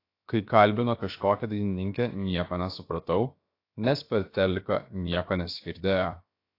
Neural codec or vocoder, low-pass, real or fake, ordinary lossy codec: codec, 16 kHz, 0.7 kbps, FocalCodec; 5.4 kHz; fake; AAC, 32 kbps